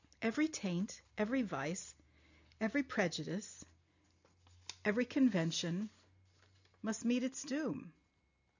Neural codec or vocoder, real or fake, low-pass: none; real; 7.2 kHz